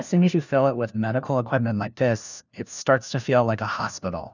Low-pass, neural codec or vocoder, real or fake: 7.2 kHz; codec, 16 kHz, 1 kbps, FunCodec, trained on LibriTTS, 50 frames a second; fake